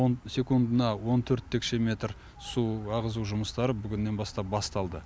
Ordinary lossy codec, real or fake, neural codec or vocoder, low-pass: none; real; none; none